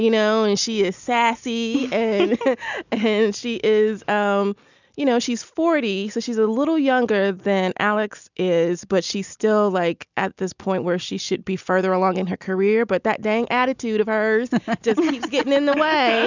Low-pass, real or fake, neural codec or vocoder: 7.2 kHz; real; none